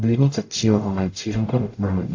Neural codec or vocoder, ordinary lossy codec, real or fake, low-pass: codec, 44.1 kHz, 0.9 kbps, DAC; AAC, 48 kbps; fake; 7.2 kHz